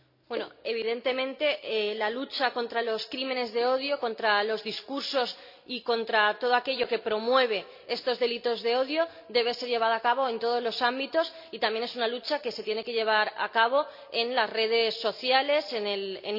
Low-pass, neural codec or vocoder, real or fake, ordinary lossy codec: 5.4 kHz; none; real; MP3, 48 kbps